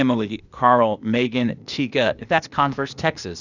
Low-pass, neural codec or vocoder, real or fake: 7.2 kHz; codec, 16 kHz, 0.8 kbps, ZipCodec; fake